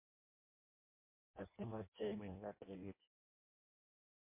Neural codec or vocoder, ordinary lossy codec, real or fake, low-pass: codec, 16 kHz in and 24 kHz out, 0.6 kbps, FireRedTTS-2 codec; MP3, 24 kbps; fake; 3.6 kHz